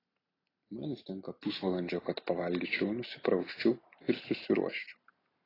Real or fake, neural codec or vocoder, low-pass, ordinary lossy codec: real; none; 5.4 kHz; AAC, 24 kbps